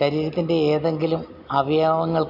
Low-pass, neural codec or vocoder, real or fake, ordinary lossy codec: 5.4 kHz; none; real; none